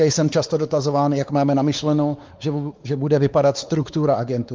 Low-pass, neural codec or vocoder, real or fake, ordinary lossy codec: 7.2 kHz; codec, 16 kHz, 4 kbps, X-Codec, WavLM features, trained on Multilingual LibriSpeech; fake; Opus, 32 kbps